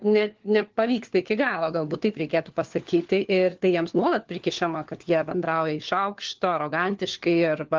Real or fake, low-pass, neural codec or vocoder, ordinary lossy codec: fake; 7.2 kHz; codec, 16 kHz, 4 kbps, FunCodec, trained on LibriTTS, 50 frames a second; Opus, 16 kbps